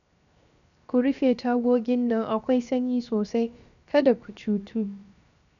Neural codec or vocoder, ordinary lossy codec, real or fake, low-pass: codec, 16 kHz, 0.7 kbps, FocalCodec; none; fake; 7.2 kHz